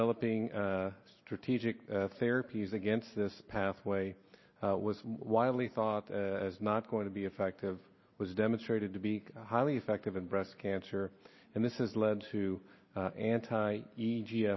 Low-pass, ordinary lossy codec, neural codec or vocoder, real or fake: 7.2 kHz; MP3, 24 kbps; none; real